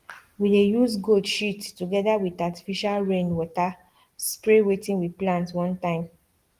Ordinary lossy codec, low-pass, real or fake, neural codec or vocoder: Opus, 24 kbps; 14.4 kHz; real; none